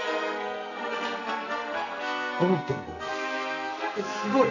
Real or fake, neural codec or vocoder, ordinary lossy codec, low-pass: fake; codec, 44.1 kHz, 2.6 kbps, SNAC; none; 7.2 kHz